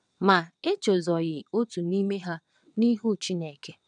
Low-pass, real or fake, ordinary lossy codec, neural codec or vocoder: 9.9 kHz; fake; none; vocoder, 22.05 kHz, 80 mel bands, WaveNeXt